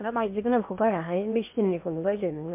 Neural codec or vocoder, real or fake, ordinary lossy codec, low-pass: codec, 16 kHz in and 24 kHz out, 0.8 kbps, FocalCodec, streaming, 65536 codes; fake; MP3, 32 kbps; 3.6 kHz